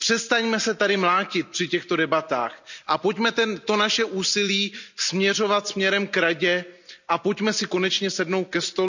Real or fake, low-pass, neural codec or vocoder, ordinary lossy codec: real; 7.2 kHz; none; none